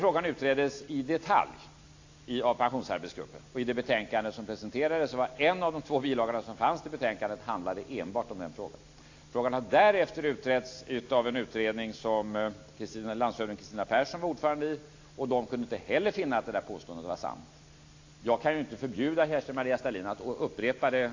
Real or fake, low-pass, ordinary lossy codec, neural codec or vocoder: real; 7.2 kHz; AAC, 48 kbps; none